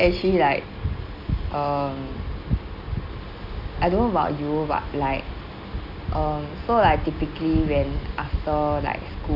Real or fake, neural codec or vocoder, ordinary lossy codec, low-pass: real; none; none; 5.4 kHz